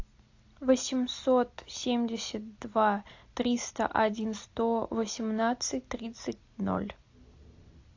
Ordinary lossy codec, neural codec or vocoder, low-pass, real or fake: AAC, 48 kbps; none; 7.2 kHz; real